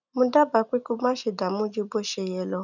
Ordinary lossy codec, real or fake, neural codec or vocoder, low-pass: none; real; none; 7.2 kHz